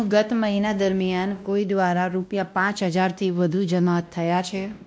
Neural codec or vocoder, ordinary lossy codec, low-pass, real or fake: codec, 16 kHz, 1 kbps, X-Codec, WavLM features, trained on Multilingual LibriSpeech; none; none; fake